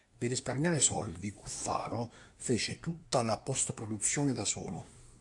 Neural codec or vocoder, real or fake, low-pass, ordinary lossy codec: codec, 24 kHz, 1 kbps, SNAC; fake; 10.8 kHz; AAC, 64 kbps